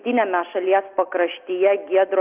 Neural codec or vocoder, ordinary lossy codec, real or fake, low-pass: none; Opus, 24 kbps; real; 3.6 kHz